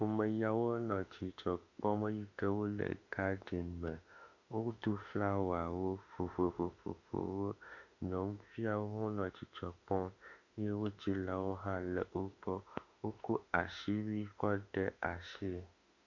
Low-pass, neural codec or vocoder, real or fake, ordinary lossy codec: 7.2 kHz; autoencoder, 48 kHz, 32 numbers a frame, DAC-VAE, trained on Japanese speech; fake; AAC, 48 kbps